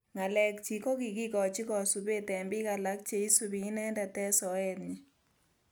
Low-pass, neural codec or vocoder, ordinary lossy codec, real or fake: none; none; none; real